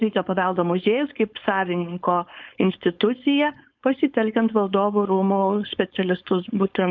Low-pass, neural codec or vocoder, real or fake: 7.2 kHz; codec, 16 kHz, 4.8 kbps, FACodec; fake